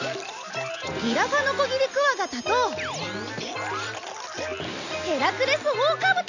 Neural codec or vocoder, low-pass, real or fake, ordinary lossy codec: none; 7.2 kHz; real; none